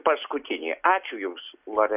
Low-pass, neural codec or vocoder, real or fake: 3.6 kHz; none; real